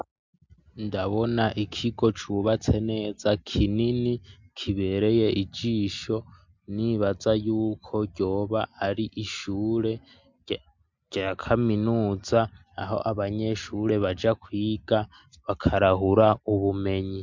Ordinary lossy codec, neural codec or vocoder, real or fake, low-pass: MP3, 64 kbps; none; real; 7.2 kHz